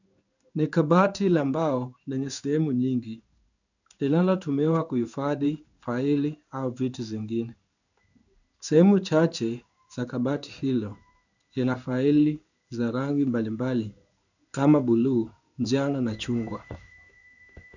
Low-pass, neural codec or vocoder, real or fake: 7.2 kHz; codec, 16 kHz in and 24 kHz out, 1 kbps, XY-Tokenizer; fake